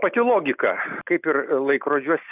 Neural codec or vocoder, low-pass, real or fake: none; 3.6 kHz; real